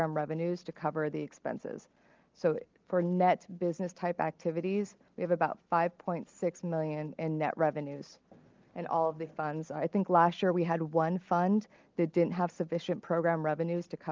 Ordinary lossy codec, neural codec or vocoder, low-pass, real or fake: Opus, 24 kbps; none; 7.2 kHz; real